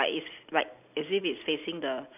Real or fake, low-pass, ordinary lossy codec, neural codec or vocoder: real; 3.6 kHz; none; none